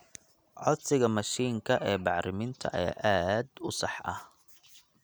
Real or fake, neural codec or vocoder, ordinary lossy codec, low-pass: real; none; none; none